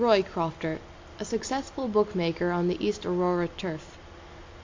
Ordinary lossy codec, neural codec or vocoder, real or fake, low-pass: MP3, 48 kbps; none; real; 7.2 kHz